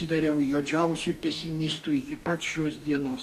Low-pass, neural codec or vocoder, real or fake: 14.4 kHz; codec, 44.1 kHz, 2.6 kbps, DAC; fake